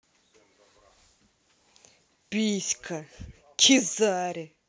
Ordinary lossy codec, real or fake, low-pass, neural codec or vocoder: none; real; none; none